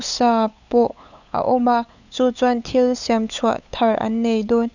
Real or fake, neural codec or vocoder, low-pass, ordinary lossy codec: fake; codec, 16 kHz, 8 kbps, FunCodec, trained on LibriTTS, 25 frames a second; 7.2 kHz; none